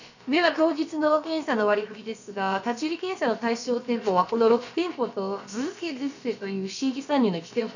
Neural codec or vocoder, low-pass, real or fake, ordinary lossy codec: codec, 16 kHz, about 1 kbps, DyCAST, with the encoder's durations; 7.2 kHz; fake; none